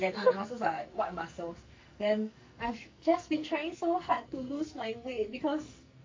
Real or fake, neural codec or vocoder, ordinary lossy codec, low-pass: fake; codec, 44.1 kHz, 2.6 kbps, SNAC; MP3, 48 kbps; 7.2 kHz